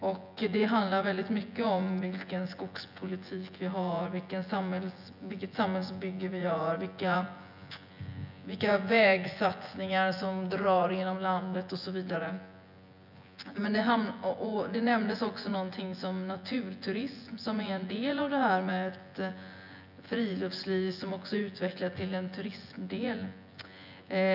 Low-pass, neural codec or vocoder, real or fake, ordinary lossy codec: 5.4 kHz; vocoder, 24 kHz, 100 mel bands, Vocos; fake; none